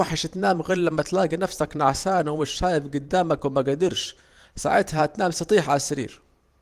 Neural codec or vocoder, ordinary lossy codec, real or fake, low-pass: vocoder, 44.1 kHz, 128 mel bands every 512 samples, BigVGAN v2; Opus, 24 kbps; fake; 14.4 kHz